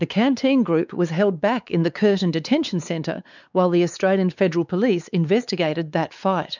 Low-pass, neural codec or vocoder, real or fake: 7.2 kHz; codec, 16 kHz, 4 kbps, X-Codec, WavLM features, trained on Multilingual LibriSpeech; fake